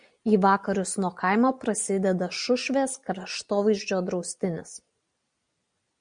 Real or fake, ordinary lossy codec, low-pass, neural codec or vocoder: real; MP3, 96 kbps; 9.9 kHz; none